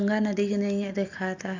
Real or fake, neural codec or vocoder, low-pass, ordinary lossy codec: real; none; 7.2 kHz; AAC, 48 kbps